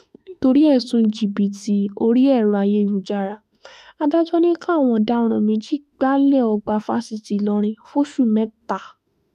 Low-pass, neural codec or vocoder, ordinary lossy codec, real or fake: 14.4 kHz; autoencoder, 48 kHz, 32 numbers a frame, DAC-VAE, trained on Japanese speech; none; fake